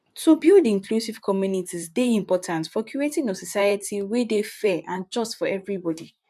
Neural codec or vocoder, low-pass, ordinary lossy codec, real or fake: vocoder, 44.1 kHz, 128 mel bands, Pupu-Vocoder; 14.4 kHz; MP3, 96 kbps; fake